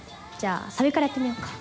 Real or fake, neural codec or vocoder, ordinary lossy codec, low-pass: real; none; none; none